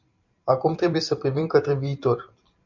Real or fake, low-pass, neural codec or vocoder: fake; 7.2 kHz; vocoder, 44.1 kHz, 128 mel bands every 256 samples, BigVGAN v2